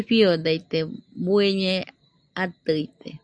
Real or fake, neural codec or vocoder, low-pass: real; none; 9.9 kHz